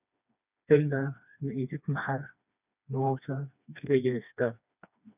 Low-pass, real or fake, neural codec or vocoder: 3.6 kHz; fake; codec, 16 kHz, 2 kbps, FreqCodec, smaller model